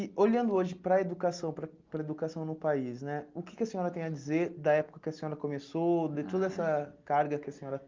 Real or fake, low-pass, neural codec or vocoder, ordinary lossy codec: real; 7.2 kHz; none; Opus, 32 kbps